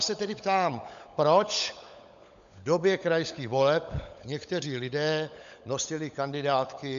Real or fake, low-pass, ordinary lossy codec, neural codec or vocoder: fake; 7.2 kHz; MP3, 64 kbps; codec, 16 kHz, 16 kbps, FunCodec, trained on LibriTTS, 50 frames a second